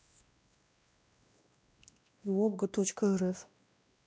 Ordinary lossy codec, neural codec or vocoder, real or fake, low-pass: none; codec, 16 kHz, 1 kbps, X-Codec, WavLM features, trained on Multilingual LibriSpeech; fake; none